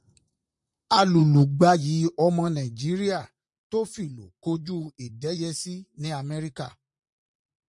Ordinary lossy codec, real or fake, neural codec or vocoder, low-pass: MP3, 64 kbps; fake; vocoder, 44.1 kHz, 128 mel bands, Pupu-Vocoder; 10.8 kHz